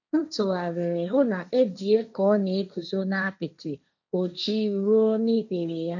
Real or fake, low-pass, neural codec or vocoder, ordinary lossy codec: fake; 7.2 kHz; codec, 16 kHz, 1.1 kbps, Voila-Tokenizer; none